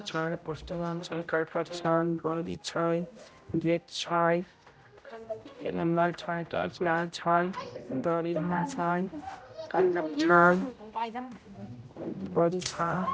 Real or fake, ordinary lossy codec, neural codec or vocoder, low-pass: fake; none; codec, 16 kHz, 0.5 kbps, X-Codec, HuBERT features, trained on general audio; none